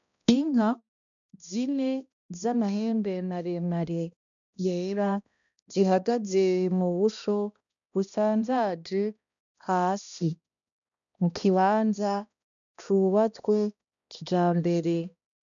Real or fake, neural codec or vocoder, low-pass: fake; codec, 16 kHz, 1 kbps, X-Codec, HuBERT features, trained on balanced general audio; 7.2 kHz